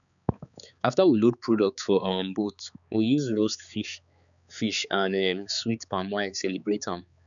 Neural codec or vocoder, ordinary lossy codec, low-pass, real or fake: codec, 16 kHz, 4 kbps, X-Codec, HuBERT features, trained on balanced general audio; none; 7.2 kHz; fake